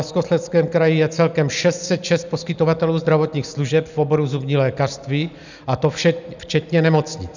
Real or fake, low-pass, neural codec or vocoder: real; 7.2 kHz; none